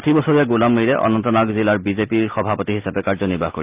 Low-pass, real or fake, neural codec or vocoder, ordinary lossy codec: 3.6 kHz; real; none; Opus, 32 kbps